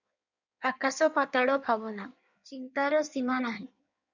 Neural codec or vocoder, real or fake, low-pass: codec, 16 kHz in and 24 kHz out, 1.1 kbps, FireRedTTS-2 codec; fake; 7.2 kHz